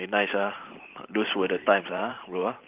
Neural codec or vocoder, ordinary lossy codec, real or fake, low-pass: vocoder, 44.1 kHz, 128 mel bands every 512 samples, BigVGAN v2; Opus, 24 kbps; fake; 3.6 kHz